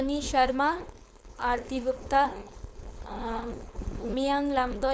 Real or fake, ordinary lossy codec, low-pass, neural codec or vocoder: fake; none; none; codec, 16 kHz, 4.8 kbps, FACodec